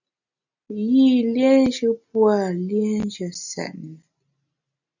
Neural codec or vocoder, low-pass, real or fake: none; 7.2 kHz; real